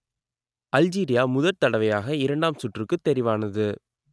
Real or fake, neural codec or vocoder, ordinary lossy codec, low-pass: real; none; none; none